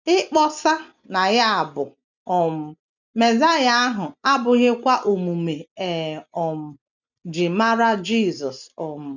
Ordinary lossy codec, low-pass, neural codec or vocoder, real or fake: none; 7.2 kHz; none; real